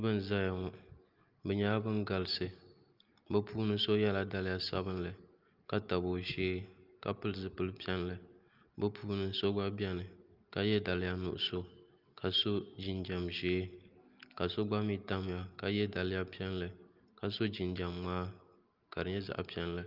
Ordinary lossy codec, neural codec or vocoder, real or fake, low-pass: Opus, 24 kbps; none; real; 5.4 kHz